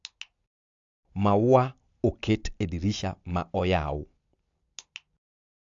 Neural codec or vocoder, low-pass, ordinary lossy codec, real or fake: none; 7.2 kHz; AAC, 64 kbps; real